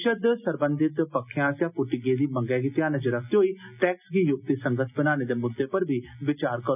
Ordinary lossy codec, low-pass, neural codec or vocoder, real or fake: none; 3.6 kHz; none; real